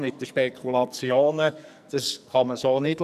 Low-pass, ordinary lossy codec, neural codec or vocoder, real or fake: 14.4 kHz; none; codec, 44.1 kHz, 2.6 kbps, SNAC; fake